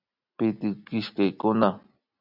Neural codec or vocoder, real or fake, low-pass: none; real; 5.4 kHz